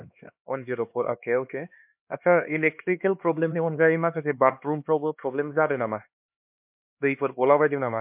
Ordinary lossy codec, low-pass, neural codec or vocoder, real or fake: MP3, 32 kbps; 3.6 kHz; codec, 16 kHz, 2 kbps, X-Codec, HuBERT features, trained on LibriSpeech; fake